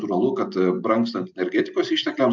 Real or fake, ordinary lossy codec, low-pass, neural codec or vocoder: real; MP3, 64 kbps; 7.2 kHz; none